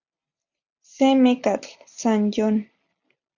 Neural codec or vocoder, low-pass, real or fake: none; 7.2 kHz; real